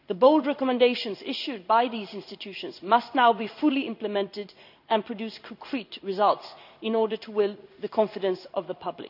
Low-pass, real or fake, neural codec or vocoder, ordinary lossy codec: 5.4 kHz; fake; codec, 16 kHz in and 24 kHz out, 1 kbps, XY-Tokenizer; none